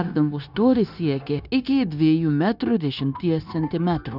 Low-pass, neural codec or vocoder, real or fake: 5.4 kHz; codec, 16 kHz, 0.9 kbps, LongCat-Audio-Codec; fake